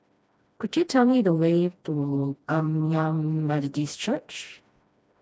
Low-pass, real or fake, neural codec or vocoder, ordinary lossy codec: none; fake; codec, 16 kHz, 1 kbps, FreqCodec, smaller model; none